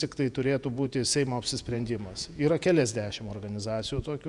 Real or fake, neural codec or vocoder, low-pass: fake; vocoder, 48 kHz, 128 mel bands, Vocos; 10.8 kHz